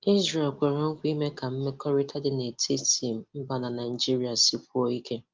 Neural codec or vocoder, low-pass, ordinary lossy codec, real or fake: none; 7.2 kHz; Opus, 32 kbps; real